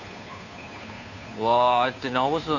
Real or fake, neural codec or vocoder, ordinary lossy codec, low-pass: fake; codec, 16 kHz, 2 kbps, FunCodec, trained on Chinese and English, 25 frames a second; none; 7.2 kHz